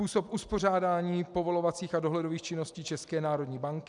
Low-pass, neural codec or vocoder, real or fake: 10.8 kHz; none; real